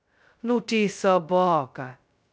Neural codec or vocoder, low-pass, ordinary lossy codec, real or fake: codec, 16 kHz, 0.2 kbps, FocalCodec; none; none; fake